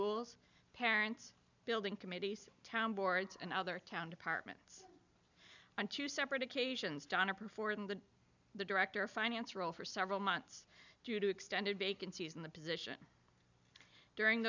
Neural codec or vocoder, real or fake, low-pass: none; real; 7.2 kHz